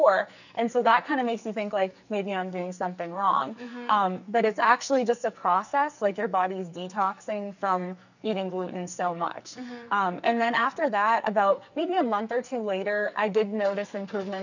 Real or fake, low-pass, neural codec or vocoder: fake; 7.2 kHz; codec, 44.1 kHz, 2.6 kbps, SNAC